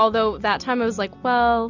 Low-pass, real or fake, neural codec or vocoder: 7.2 kHz; real; none